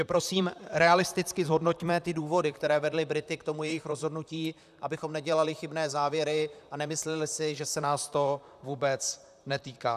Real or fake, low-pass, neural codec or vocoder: fake; 14.4 kHz; vocoder, 44.1 kHz, 128 mel bands, Pupu-Vocoder